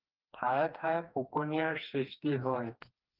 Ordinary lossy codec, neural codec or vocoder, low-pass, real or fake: Opus, 24 kbps; codec, 16 kHz, 2 kbps, FreqCodec, smaller model; 5.4 kHz; fake